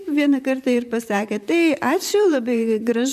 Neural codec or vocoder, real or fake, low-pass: vocoder, 44.1 kHz, 128 mel bands, Pupu-Vocoder; fake; 14.4 kHz